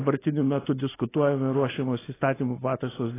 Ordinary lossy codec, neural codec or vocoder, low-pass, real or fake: AAC, 16 kbps; none; 3.6 kHz; real